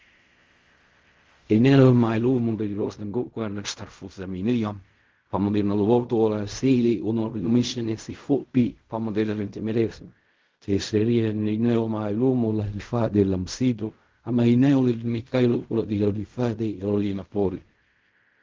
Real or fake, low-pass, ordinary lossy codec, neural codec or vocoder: fake; 7.2 kHz; Opus, 32 kbps; codec, 16 kHz in and 24 kHz out, 0.4 kbps, LongCat-Audio-Codec, fine tuned four codebook decoder